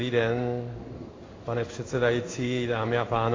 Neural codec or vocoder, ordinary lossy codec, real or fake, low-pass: codec, 16 kHz in and 24 kHz out, 1 kbps, XY-Tokenizer; AAC, 32 kbps; fake; 7.2 kHz